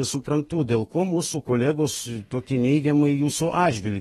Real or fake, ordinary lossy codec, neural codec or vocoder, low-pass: fake; AAC, 32 kbps; codec, 32 kHz, 1.9 kbps, SNAC; 14.4 kHz